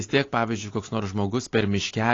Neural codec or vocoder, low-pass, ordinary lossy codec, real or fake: none; 7.2 kHz; AAC, 32 kbps; real